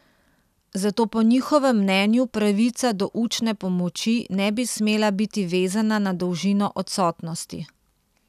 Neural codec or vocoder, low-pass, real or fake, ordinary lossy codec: none; 14.4 kHz; real; none